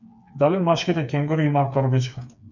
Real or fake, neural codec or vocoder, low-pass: fake; codec, 16 kHz, 4 kbps, FreqCodec, smaller model; 7.2 kHz